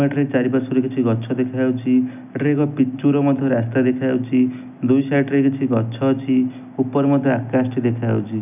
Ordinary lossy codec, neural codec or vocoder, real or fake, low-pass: none; none; real; 3.6 kHz